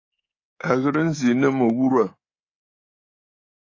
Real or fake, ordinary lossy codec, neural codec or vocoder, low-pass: fake; AAC, 32 kbps; codec, 24 kHz, 3.1 kbps, DualCodec; 7.2 kHz